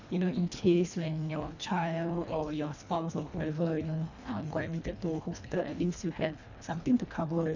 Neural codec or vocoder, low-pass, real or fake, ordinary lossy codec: codec, 24 kHz, 1.5 kbps, HILCodec; 7.2 kHz; fake; none